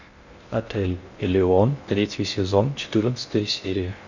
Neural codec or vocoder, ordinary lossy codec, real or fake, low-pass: codec, 16 kHz in and 24 kHz out, 0.6 kbps, FocalCodec, streaming, 2048 codes; Opus, 64 kbps; fake; 7.2 kHz